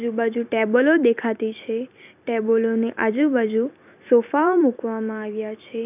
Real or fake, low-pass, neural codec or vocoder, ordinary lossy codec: fake; 3.6 kHz; vocoder, 44.1 kHz, 128 mel bands every 256 samples, BigVGAN v2; none